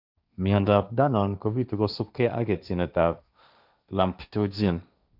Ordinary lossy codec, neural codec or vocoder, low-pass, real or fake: none; codec, 16 kHz, 1.1 kbps, Voila-Tokenizer; 5.4 kHz; fake